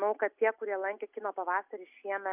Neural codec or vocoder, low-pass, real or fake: none; 3.6 kHz; real